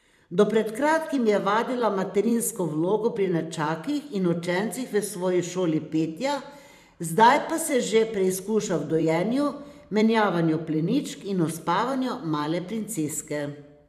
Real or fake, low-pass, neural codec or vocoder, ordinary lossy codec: fake; 14.4 kHz; vocoder, 44.1 kHz, 128 mel bands every 512 samples, BigVGAN v2; none